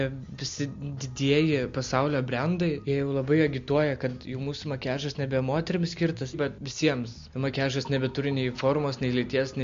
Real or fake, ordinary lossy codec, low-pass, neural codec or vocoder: real; MP3, 48 kbps; 7.2 kHz; none